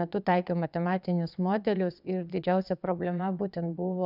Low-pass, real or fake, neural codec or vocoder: 5.4 kHz; fake; vocoder, 22.05 kHz, 80 mel bands, WaveNeXt